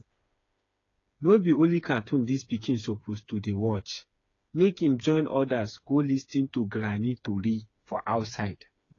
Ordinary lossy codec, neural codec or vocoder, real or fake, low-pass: AAC, 32 kbps; codec, 16 kHz, 4 kbps, FreqCodec, smaller model; fake; 7.2 kHz